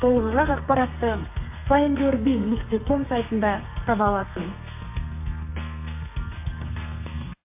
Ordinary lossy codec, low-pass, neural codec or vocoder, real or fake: MP3, 32 kbps; 3.6 kHz; codec, 32 kHz, 1.9 kbps, SNAC; fake